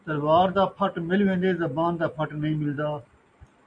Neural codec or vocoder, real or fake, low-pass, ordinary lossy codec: none; real; 9.9 kHz; AAC, 64 kbps